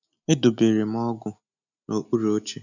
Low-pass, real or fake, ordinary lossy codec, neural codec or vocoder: 7.2 kHz; real; none; none